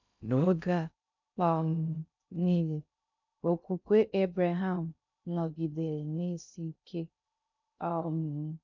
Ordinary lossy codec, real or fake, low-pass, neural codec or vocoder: none; fake; 7.2 kHz; codec, 16 kHz in and 24 kHz out, 0.6 kbps, FocalCodec, streaming, 2048 codes